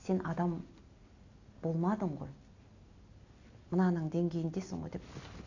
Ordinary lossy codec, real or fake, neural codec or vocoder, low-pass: AAC, 48 kbps; real; none; 7.2 kHz